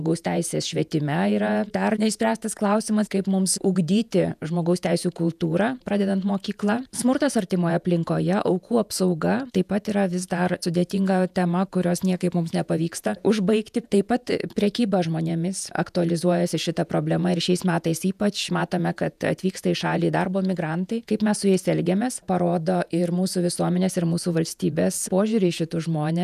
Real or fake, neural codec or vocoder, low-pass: fake; vocoder, 48 kHz, 128 mel bands, Vocos; 14.4 kHz